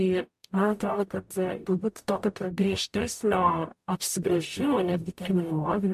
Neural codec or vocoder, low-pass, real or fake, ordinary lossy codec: codec, 44.1 kHz, 0.9 kbps, DAC; 14.4 kHz; fake; MP3, 64 kbps